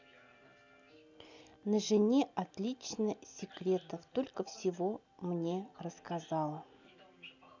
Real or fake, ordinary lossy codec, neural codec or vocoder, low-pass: real; none; none; 7.2 kHz